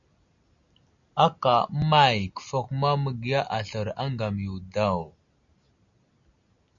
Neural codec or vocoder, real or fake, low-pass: none; real; 7.2 kHz